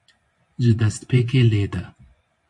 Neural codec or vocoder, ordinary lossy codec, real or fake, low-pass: vocoder, 24 kHz, 100 mel bands, Vocos; MP3, 48 kbps; fake; 10.8 kHz